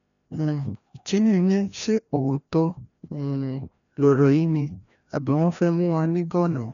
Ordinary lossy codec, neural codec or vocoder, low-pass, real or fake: none; codec, 16 kHz, 1 kbps, FreqCodec, larger model; 7.2 kHz; fake